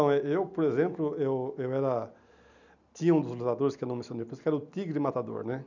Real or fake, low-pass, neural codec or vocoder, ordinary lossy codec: real; 7.2 kHz; none; none